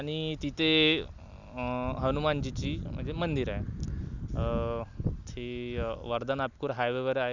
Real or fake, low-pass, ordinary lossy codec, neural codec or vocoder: real; 7.2 kHz; Opus, 64 kbps; none